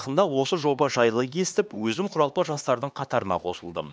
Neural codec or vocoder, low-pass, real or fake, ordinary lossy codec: codec, 16 kHz, 2 kbps, X-Codec, HuBERT features, trained on LibriSpeech; none; fake; none